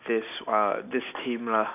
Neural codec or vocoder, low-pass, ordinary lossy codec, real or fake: none; 3.6 kHz; none; real